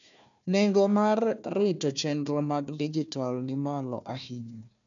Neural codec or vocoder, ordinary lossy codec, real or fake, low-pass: codec, 16 kHz, 1 kbps, FunCodec, trained on Chinese and English, 50 frames a second; none; fake; 7.2 kHz